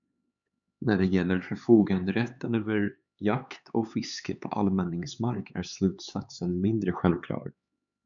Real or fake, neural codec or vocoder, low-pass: fake; codec, 16 kHz, 4 kbps, X-Codec, HuBERT features, trained on LibriSpeech; 7.2 kHz